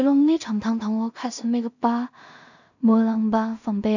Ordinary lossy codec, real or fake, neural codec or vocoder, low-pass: none; fake; codec, 16 kHz in and 24 kHz out, 0.4 kbps, LongCat-Audio-Codec, two codebook decoder; 7.2 kHz